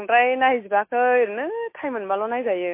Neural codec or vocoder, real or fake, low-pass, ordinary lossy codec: none; real; 3.6 kHz; MP3, 24 kbps